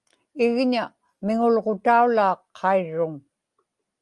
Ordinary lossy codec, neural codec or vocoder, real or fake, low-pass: Opus, 32 kbps; none; real; 10.8 kHz